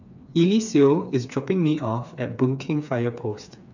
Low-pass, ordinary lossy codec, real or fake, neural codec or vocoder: 7.2 kHz; none; fake; codec, 16 kHz, 4 kbps, FreqCodec, smaller model